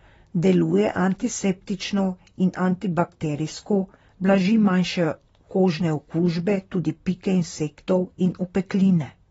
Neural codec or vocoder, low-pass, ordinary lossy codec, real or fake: vocoder, 44.1 kHz, 128 mel bands, Pupu-Vocoder; 19.8 kHz; AAC, 24 kbps; fake